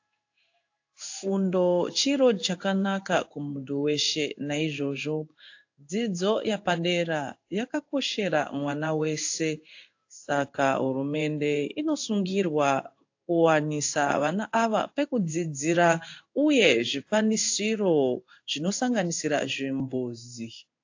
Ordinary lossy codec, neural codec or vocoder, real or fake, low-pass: AAC, 48 kbps; codec, 16 kHz in and 24 kHz out, 1 kbps, XY-Tokenizer; fake; 7.2 kHz